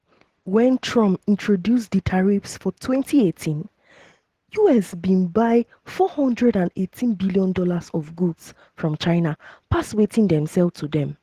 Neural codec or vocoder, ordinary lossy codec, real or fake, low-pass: none; Opus, 16 kbps; real; 14.4 kHz